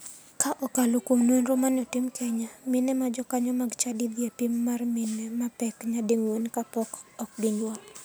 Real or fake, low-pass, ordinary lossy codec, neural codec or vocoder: real; none; none; none